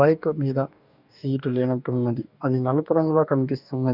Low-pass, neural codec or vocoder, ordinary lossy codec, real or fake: 5.4 kHz; codec, 44.1 kHz, 2.6 kbps, DAC; none; fake